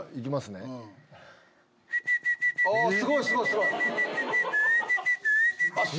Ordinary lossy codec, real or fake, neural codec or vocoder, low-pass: none; real; none; none